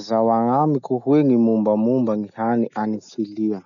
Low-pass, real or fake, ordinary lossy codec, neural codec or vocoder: 7.2 kHz; real; none; none